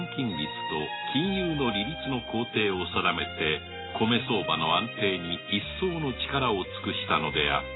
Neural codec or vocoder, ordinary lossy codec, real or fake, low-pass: none; AAC, 16 kbps; real; 7.2 kHz